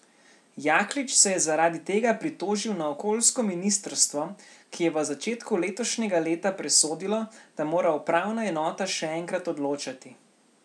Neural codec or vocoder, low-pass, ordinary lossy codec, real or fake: none; none; none; real